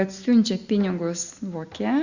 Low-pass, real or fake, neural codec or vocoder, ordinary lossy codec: 7.2 kHz; real; none; Opus, 64 kbps